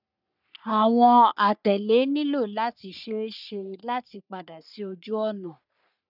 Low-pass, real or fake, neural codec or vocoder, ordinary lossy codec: 5.4 kHz; fake; codec, 44.1 kHz, 3.4 kbps, Pupu-Codec; none